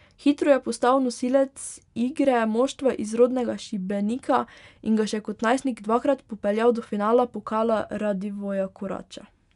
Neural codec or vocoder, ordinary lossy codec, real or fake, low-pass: none; none; real; 10.8 kHz